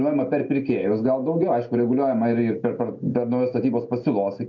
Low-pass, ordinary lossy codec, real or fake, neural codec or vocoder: 7.2 kHz; MP3, 48 kbps; real; none